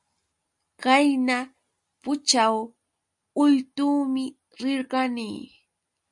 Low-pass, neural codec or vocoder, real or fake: 10.8 kHz; none; real